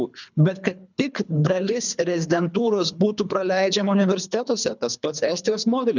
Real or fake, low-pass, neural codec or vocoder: fake; 7.2 kHz; codec, 24 kHz, 3 kbps, HILCodec